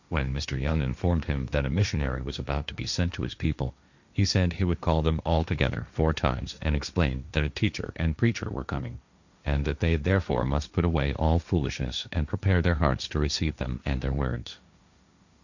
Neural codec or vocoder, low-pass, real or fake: codec, 16 kHz, 1.1 kbps, Voila-Tokenizer; 7.2 kHz; fake